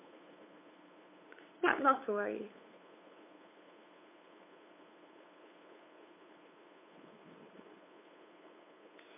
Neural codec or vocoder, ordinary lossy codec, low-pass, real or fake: codec, 16 kHz, 8 kbps, FunCodec, trained on LibriTTS, 25 frames a second; MP3, 24 kbps; 3.6 kHz; fake